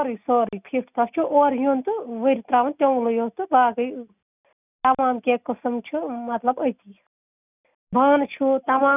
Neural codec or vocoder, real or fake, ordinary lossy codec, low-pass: none; real; none; 3.6 kHz